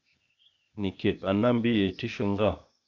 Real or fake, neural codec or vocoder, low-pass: fake; codec, 16 kHz, 0.8 kbps, ZipCodec; 7.2 kHz